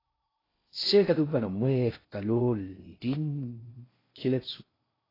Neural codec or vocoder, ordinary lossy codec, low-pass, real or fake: codec, 16 kHz in and 24 kHz out, 0.6 kbps, FocalCodec, streaming, 4096 codes; AAC, 24 kbps; 5.4 kHz; fake